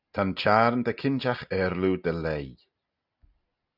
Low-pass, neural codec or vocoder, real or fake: 5.4 kHz; none; real